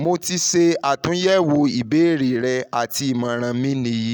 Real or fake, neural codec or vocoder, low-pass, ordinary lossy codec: real; none; none; none